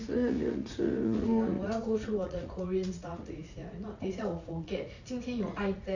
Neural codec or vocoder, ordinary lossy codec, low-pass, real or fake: vocoder, 44.1 kHz, 80 mel bands, Vocos; none; 7.2 kHz; fake